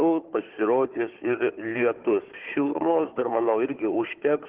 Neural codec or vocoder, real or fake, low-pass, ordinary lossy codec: codec, 16 kHz, 4 kbps, FunCodec, trained on Chinese and English, 50 frames a second; fake; 3.6 kHz; Opus, 16 kbps